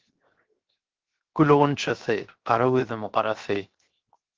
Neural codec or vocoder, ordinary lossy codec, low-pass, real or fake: codec, 16 kHz, 0.7 kbps, FocalCodec; Opus, 16 kbps; 7.2 kHz; fake